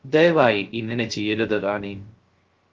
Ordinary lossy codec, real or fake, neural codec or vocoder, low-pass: Opus, 16 kbps; fake; codec, 16 kHz, 0.3 kbps, FocalCodec; 7.2 kHz